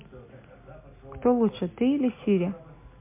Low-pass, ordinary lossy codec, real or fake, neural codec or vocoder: 3.6 kHz; MP3, 24 kbps; real; none